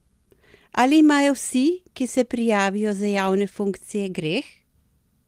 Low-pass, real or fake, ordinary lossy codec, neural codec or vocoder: 14.4 kHz; real; Opus, 24 kbps; none